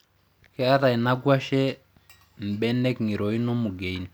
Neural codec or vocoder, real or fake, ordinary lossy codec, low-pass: none; real; none; none